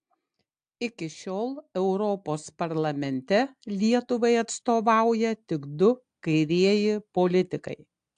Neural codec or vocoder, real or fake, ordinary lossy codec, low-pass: none; real; AAC, 64 kbps; 9.9 kHz